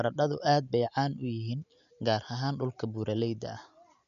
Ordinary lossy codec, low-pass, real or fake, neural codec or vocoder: none; 7.2 kHz; real; none